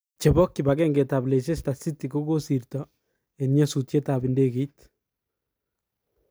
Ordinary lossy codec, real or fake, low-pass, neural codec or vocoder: none; real; none; none